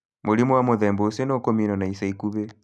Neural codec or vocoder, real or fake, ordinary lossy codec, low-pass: none; real; none; none